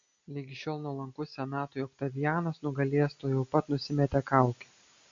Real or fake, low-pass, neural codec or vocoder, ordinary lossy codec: real; 7.2 kHz; none; MP3, 64 kbps